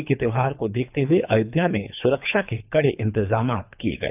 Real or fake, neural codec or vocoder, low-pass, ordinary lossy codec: fake; codec, 24 kHz, 3 kbps, HILCodec; 3.6 kHz; none